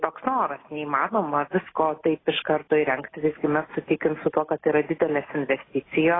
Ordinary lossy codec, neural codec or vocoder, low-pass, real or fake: AAC, 16 kbps; none; 7.2 kHz; real